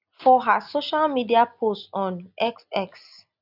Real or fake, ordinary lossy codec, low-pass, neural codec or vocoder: real; none; 5.4 kHz; none